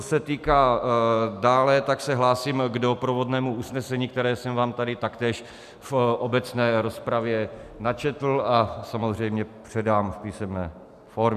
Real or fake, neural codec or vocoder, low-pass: real; none; 14.4 kHz